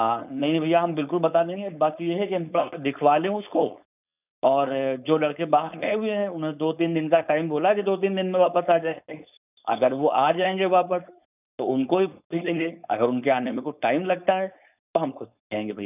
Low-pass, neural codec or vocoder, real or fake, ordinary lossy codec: 3.6 kHz; codec, 16 kHz, 4.8 kbps, FACodec; fake; none